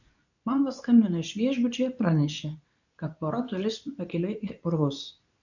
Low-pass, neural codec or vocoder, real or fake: 7.2 kHz; codec, 24 kHz, 0.9 kbps, WavTokenizer, medium speech release version 2; fake